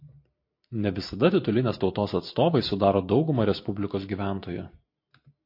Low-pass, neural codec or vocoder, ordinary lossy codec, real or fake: 5.4 kHz; none; MP3, 32 kbps; real